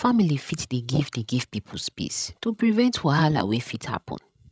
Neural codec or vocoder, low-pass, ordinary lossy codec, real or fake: codec, 16 kHz, 16 kbps, FreqCodec, larger model; none; none; fake